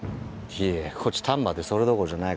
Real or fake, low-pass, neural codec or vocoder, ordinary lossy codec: real; none; none; none